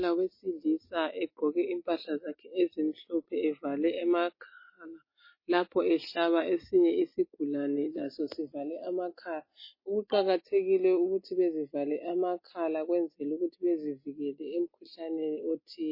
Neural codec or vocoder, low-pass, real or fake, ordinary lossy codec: none; 5.4 kHz; real; MP3, 24 kbps